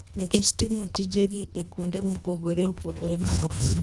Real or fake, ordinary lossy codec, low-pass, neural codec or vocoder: fake; none; none; codec, 24 kHz, 1.5 kbps, HILCodec